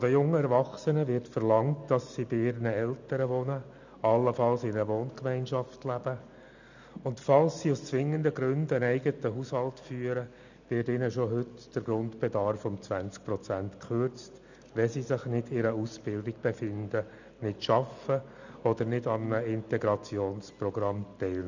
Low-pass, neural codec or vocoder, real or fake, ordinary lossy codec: 7.2 kHz; none; real; none